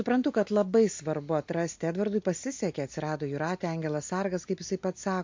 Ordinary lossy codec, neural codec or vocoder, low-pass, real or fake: MP3, 48 kbps; none; 7.2 kHz; real